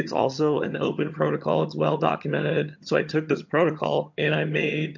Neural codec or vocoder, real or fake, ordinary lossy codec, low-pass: vocoder, 22.05 kHz, 80 mel bands, HiFi-GAN; fake; MP3, 48 kbps; 7.2 kHz